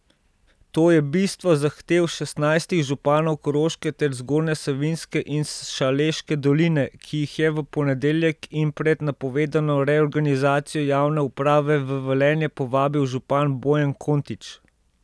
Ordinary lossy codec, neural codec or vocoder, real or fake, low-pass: none; none; real; none